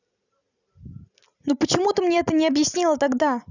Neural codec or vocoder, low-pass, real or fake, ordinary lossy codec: none; 7.2 kHz; real; none